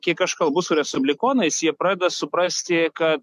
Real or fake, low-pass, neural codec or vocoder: real; 14.4 kHz; none